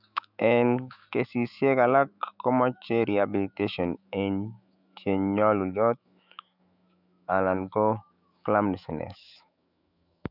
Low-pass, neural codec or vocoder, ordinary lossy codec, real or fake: 5.4 kHz; autoencoder, 48 kHz, 128 numbers a frame, DAC-VAE, trained on Japanese speech; none; fake